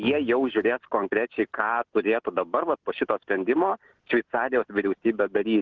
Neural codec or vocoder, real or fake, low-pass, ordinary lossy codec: none; real; 7.2 kHz; Opus, 16 kbps